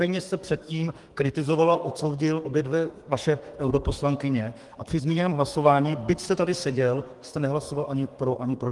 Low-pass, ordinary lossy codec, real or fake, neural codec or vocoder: 10.8 kHz; Opus, 24 kbps; fake; codec, 32 kHz, 1.9 kbps, SNAC